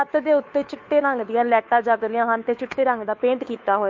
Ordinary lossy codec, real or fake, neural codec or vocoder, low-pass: MP3, 48 kbps; fake; codec, 16 kHz, 2 kbps, FunCodec, trained on Chinese and English, 25 frames a second; 7.2 kHz